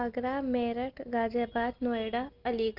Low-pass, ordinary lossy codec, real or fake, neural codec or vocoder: 5.4 kHz; Opus, 32 kbps; real; none